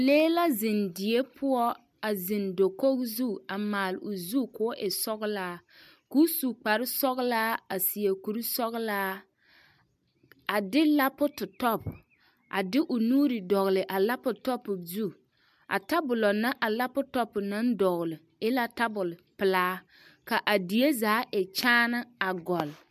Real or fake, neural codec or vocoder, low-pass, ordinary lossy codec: real; none; 14.4 kHz; MP3, 96 kbps